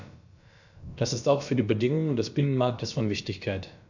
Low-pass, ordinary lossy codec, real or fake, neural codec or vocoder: 7.2 kHz; none; fake; codec, 16 kHz, about 1 kbps, DyCAST, with the encoder's durations